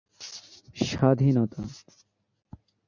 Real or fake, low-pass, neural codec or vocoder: real; 7.2 kHz; none